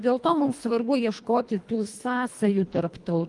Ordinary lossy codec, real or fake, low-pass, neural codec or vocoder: Opus, 32 kbps; fake; 10.8 kHz; codec, 24 kHz, 1.5 kbps, HILCodec